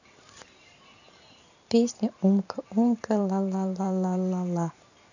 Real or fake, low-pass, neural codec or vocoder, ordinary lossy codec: fake; 7.2 kHz; vocoder, 44.1 kHz, 128 mel bands every 512 samples, BigVGAN v2; none